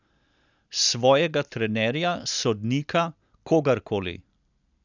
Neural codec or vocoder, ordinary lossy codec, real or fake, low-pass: none; none; real; 7.2 kHz